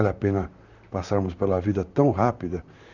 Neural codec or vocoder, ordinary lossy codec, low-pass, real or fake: vocoder, 44.1 kHz, 128 mel bands, Pupu-Vocoder; none; 7.2 kHz; fake